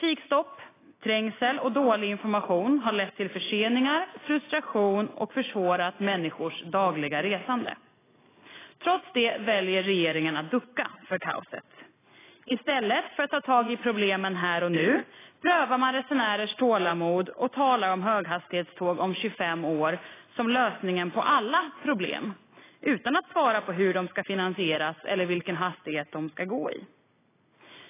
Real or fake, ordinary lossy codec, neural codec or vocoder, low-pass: real; AAC, 16 kbps; none; 3.6 kHz